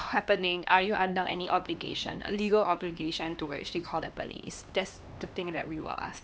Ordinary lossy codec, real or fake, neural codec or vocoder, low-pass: none; fake; codec, 16 kHz, 2 kbps, X-Codec, HuBERT features, trained on LibriSpeech; none